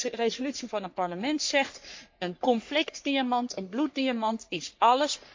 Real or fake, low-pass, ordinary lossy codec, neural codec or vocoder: fake; 7.2 kHz; MP3, 48 kbps; codec, 44.1 kHz, 3.4 kbps, Pupu-Codec